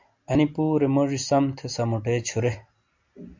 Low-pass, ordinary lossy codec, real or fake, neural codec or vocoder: 7.2 kHz; MP3, 64 kbps; real; none